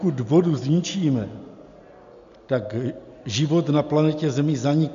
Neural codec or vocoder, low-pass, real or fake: none; 7.2 kHz; real